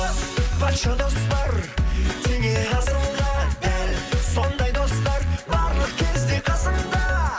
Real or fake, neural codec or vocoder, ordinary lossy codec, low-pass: real; none; none; none